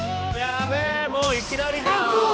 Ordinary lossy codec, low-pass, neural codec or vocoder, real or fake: none; none; codec, 16 kHz, 2 kbps, X-Codec, HuBERT features, trained on balanced general audio; fake